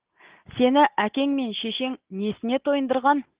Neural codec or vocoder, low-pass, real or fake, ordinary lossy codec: none; 3.6 kHz; real; Opus, 16 kbps